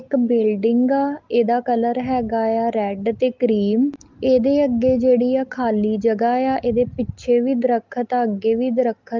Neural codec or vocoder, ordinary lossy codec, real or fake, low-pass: none; Opus, 32 kbps; real; 7.2 kHz